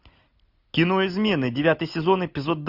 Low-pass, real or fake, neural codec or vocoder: 5.4 kHz; real; none